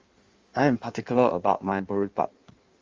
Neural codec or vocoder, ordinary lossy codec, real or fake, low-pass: codec, 16 kHz in and 24 kHz out, 1.1 kbps, FireRedTTS-2 codec; Opus, 32 kbps; fake; 7.2 kHz